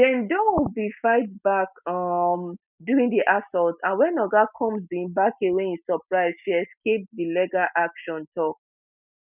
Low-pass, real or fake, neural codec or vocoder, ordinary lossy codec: 3.6 kHz; real; none; none